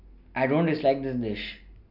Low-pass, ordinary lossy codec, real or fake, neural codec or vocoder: 5.4 kHz; none; real; none